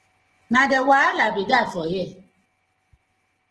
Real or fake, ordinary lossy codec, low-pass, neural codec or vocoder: fake; Opus, 16 kbps; 10.8 kHz; vocoder, 24 kHz, 100 mel bands, Vocos